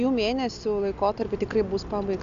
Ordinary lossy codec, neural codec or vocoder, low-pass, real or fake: AAC, 64 kbps; none; 7.2 kHz; real